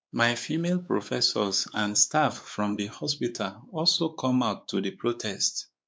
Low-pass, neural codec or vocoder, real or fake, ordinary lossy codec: none; codec, 16 kHz, 4 kbps, X-Codec, WavLM features, trained on Multilingual LibriSpeech; fake; none